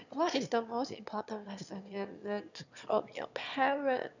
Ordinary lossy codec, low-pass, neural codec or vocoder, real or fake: none; 7.2 kHz; autoencoder, 22.05 kHz, a latent of 192 numbers a frame, VITS, trained on one speaker; fake